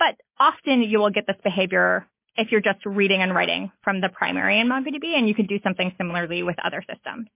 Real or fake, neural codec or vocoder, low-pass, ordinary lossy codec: real; none; 3.6 kHz; MP3, 24 kbps